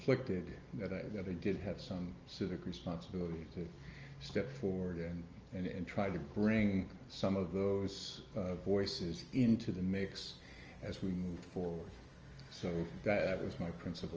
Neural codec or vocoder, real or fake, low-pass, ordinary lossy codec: none; real; 7.2 kHz; Opus, 24 kbps